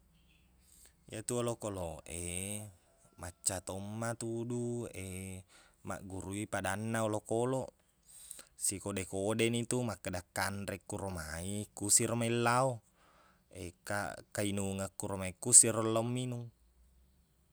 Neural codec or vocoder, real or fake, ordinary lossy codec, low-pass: none; real; none; none